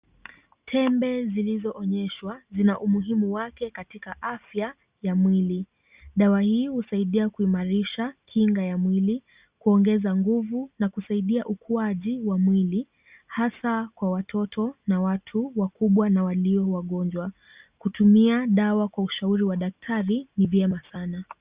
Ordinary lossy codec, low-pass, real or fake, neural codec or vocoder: Opus, 64 kbps; 3.6 kHz; real; none